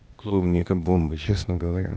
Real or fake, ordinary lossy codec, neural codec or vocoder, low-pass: fake; none; codec, 16 kHz, 0.8 kbps, ZipCodec; none